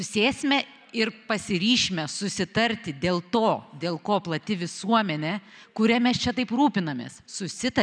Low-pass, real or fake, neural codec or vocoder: 9.9 kHz; real; none